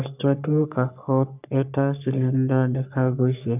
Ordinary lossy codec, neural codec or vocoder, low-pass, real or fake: none; codec, 44.1 kHz, 3.4 kbps, Pupu-Codec; 3.6 kHz; fake